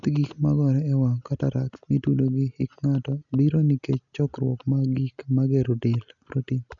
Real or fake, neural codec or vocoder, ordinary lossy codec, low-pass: real; none; none; 7.2 kHz